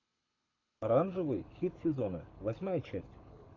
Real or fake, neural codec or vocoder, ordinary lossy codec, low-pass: fake; codec, 24 kHz, 6 kbps, HILCodec; AAC, 48 kbps; 7.2 kHz